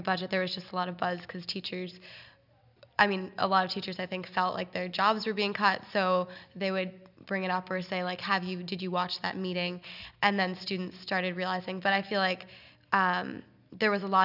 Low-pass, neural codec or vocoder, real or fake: 5.4 kHz; none; real